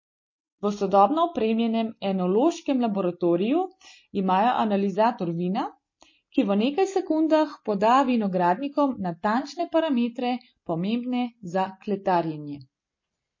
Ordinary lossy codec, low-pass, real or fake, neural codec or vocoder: MP3, 32 kbps; 7.2 kHz; real; none